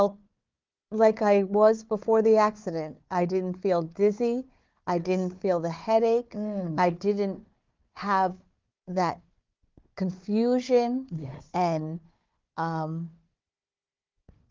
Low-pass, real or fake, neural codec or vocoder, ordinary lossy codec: 7.2 kHz; fake; codec, 16 kHz, 4 kbps, FunCodec, trained on Chinese and English, 50 frames a second; Opus, 32 kbps